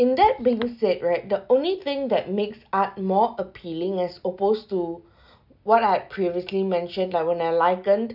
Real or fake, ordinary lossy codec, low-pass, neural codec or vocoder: real; none; 5.4 kHz; none